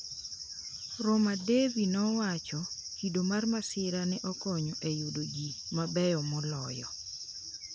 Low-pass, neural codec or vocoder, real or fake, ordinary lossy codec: none; none; real; none